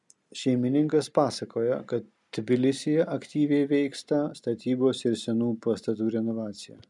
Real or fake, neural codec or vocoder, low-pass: real; none; 10.8 kHz